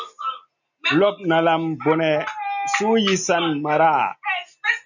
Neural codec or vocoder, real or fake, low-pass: none; real; 7.2 kHz